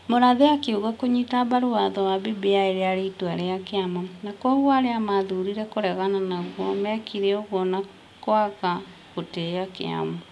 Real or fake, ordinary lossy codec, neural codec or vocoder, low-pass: real; none; none; none